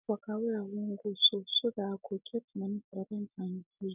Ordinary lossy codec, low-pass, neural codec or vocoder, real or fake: none; 3.6 kHz; none; real